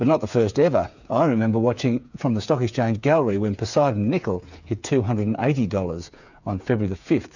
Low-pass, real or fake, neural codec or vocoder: 7.2 kHz; fake; codec, 16 kHz, 8 kbps, FreqCodec, smaller model